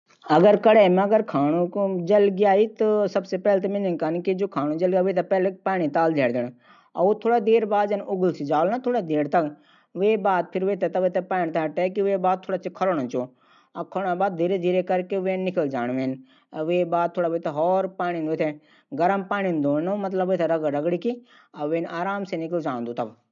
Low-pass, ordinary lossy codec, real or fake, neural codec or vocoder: 7.2 kHz; none; real; none